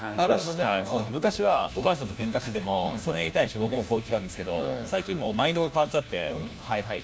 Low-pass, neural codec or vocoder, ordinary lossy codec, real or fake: none; codec, 16 kHz, 1 kbps, FunCodec, trained on LibriTTS, 50 frames a second; none; fake